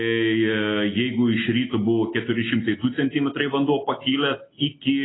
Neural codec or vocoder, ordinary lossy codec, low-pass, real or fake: none; AAC, 16 kbps; 7.2 kHz; real